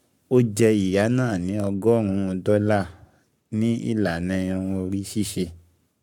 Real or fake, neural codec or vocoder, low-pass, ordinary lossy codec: fake; codec, 44.1 kHz, 7.8 kbps, Pupu-Codec; 19.8 kHz; none